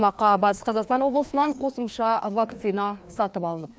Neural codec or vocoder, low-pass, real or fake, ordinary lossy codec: codec, 16 kHz, 2 kbps, FreqCodec, larger model; none; fake; none